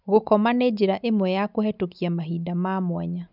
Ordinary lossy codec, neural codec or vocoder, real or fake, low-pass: none; none; real; 5.4 kHz